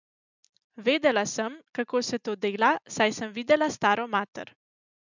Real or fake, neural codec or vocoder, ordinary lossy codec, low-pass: real; none; none; 7.2 kHz